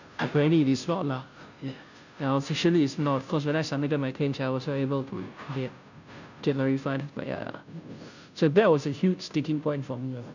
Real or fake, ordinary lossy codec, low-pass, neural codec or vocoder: fake; none; 7.2 kHz; codec, 16 kHz, 0.5 kbps, FunCodec, trained on Chinese and English, 25 frames a second